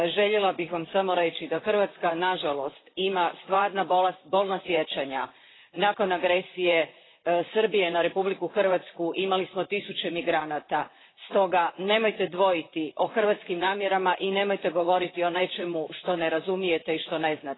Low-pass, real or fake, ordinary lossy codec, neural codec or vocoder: 7.2 kHz; fake; AAC, 16 kbps; vocoder, 44.1 kHz, 128 mel bands, Pupu-Vocoder